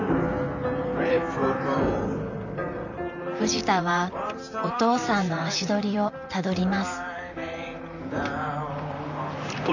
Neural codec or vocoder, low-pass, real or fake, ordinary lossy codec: vocoder, 44.1 kHz, 128 mel bands, Pupu-Vocoder; 7.2 kHz; fake; none